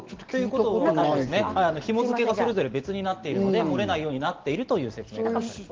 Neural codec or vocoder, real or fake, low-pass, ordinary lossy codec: none; real; 7.2 kHz; Opus, 16 kbps